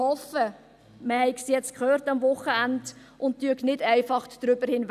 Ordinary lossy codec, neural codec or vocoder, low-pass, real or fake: none; none; 14.4 kHz; real